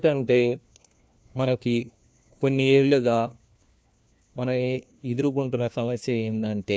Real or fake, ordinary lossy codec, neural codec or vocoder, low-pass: fake; none; codec, 16 kHz, 1 kbps, FunCodec, trained on LibriTTS, 50 frames a second; none